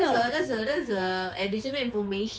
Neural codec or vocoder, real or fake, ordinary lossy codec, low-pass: codec, 16 kHz, 4 kbps, X-Codec, HuBERT features, trained on general audio; fake; none; none